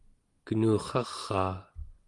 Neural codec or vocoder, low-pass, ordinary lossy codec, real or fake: none; 10.8 kHz; Opus, 24 kbps; real